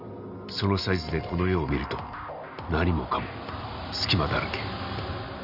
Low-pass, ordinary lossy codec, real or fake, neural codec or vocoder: 5.4 kHz; none; real; none